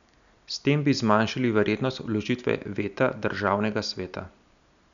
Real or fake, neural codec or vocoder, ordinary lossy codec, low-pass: real; none; none; 7.2 kHz